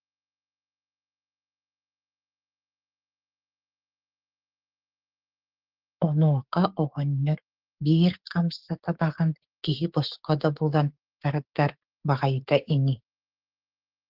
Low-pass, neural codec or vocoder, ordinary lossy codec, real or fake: 5.4 kHz; codec, 16 kHz, 6 kbps, DAC; Opus, 16 kbps; fake